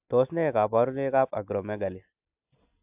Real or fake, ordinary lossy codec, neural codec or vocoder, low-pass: real; AAC, 32 kbps; none; 3.6 kHz